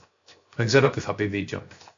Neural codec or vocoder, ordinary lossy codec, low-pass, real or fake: codec, 16 kHz, 0.7 kbps, FocalCodec; AAC, 48 kbps; 7.2 kHz; fake